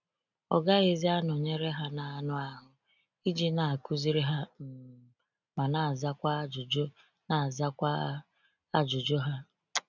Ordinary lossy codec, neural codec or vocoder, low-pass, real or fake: none; none; 7.2 kHz; real